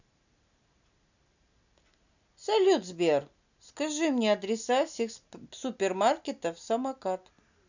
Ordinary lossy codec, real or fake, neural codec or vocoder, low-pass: none; real; none; 7.2 kHz